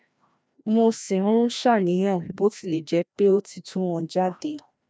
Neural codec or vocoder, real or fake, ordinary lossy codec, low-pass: codec, 16 kHz, 1 kbps, FreqCodec, larger model; fake; none; none